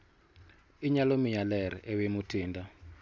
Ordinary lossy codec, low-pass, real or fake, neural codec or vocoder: none; none; real; none